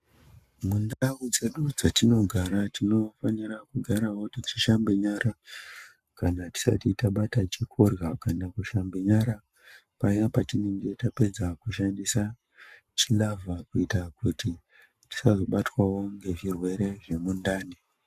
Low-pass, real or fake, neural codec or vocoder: 14.4 kHz; fake; codec, 44.1 kHz, 7.8 kbps, Pupu-Codec